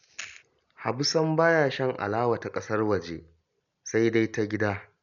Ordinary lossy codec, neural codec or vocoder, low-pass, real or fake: none; none; 7.2 kHz; real